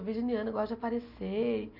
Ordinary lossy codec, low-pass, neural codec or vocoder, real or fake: none; 5.4 kHz; none; real